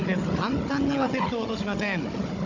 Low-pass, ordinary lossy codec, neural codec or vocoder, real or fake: 7.2 kHz; Opus, 64 kbps; codec, 16 kHz, 16 kbps, FunCodec, trained on Chinese and English, 50 frames a second; fake